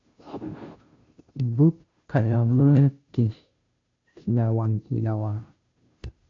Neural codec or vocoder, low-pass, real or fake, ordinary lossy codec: codec, 16 kHz, 0.5 kbps, FunCodec, trained on Chinese and English, 25 frames a second; 7.2 kHz; fake; AAC, 48 kbps